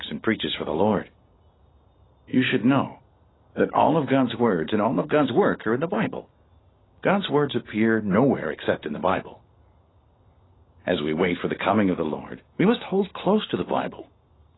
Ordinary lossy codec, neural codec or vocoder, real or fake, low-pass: AAC, 16 kbps; none; real; 7.2 kHz